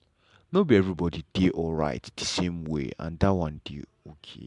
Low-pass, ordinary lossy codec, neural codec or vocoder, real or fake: 10.8 kHz; MP3, 96 kbps; none; real